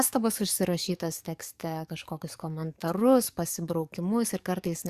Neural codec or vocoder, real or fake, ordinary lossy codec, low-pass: codec, 44.1 kHz, 7.8 kbps, DAC; fake; Opus, 64 kbps; 14.4 kHz